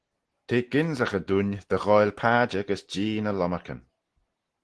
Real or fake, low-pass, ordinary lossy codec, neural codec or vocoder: real; 10.8 kHz; Opus, 16 kbps; none